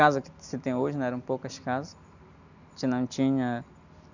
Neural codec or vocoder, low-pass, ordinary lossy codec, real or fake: none; 7.2 kHz; none; real